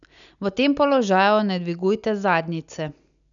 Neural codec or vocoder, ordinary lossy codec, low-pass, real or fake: none; none; 7.2 kHz; real